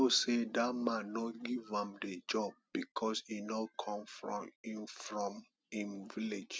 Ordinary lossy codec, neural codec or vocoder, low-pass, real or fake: none; none; none; real